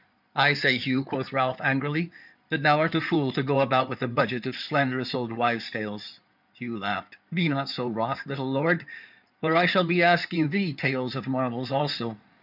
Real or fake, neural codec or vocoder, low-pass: fake; codec, 16 kHz in and 24 kHz out, 2.2 kbps, FireRedTTS-2 codec; 5.4 kHz